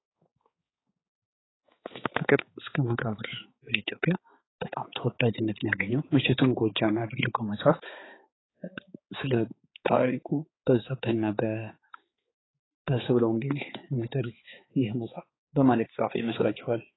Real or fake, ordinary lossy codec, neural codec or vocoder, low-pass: fake; AAC, 16 kbps; codec, 16 kHz, 4 kbps, X-Codec, HuBERT features, trained on balanced general audio; 7.2 kHz